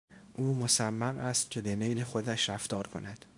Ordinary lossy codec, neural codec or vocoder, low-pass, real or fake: MP3, 64 kbps; codec, 24 kHz, 0.9 kbps, WavTokenizer, small release; 10.8 kHz; fake